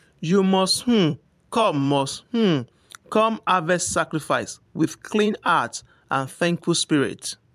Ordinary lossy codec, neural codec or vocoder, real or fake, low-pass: MP3, 96 kbps; vocoder, 44.1 kHz, 128 mel bands every 512 samples, BigVGAN v2; fake; 14.4 kHz